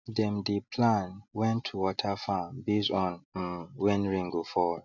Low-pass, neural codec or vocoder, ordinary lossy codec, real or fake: 7.2 kHz; none; none; real